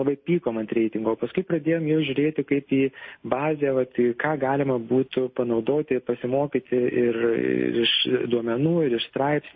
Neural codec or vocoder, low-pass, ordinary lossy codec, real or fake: none; 7.2 kHz; MP3, 24 kbps; real